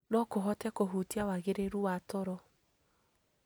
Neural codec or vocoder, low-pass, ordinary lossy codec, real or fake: none; none; none; real